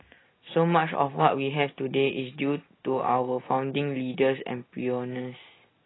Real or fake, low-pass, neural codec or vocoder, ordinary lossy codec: real; 7.2 kHz; none; AAC, 16 kbps